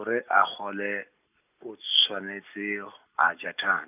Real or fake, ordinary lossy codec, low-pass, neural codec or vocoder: real; none; 3.6 kHz; none